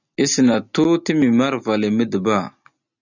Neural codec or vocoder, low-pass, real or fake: none; 7.2 kHz; real